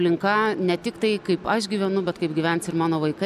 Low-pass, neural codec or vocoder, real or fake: 14.4 kHz; none; real